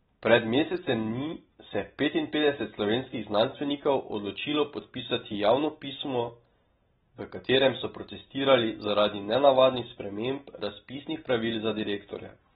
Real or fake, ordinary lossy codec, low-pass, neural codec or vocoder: real; AAC, 16 kbps; 19.8 kHz; none